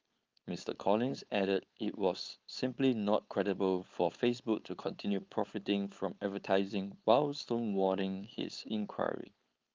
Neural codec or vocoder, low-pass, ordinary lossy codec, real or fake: codec, 16 kHz, 4.8 kbps, FACodec; 7.2 kHz; Opus, 24 kbps; fake